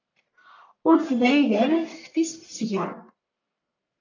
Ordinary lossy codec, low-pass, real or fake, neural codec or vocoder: AAC, 48 kbps; 7.2 kHz; fake; codec, 44.1 kHz, 1.7 kbps, Pupu-Codec